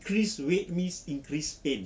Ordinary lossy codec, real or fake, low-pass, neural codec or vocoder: none; real; none; none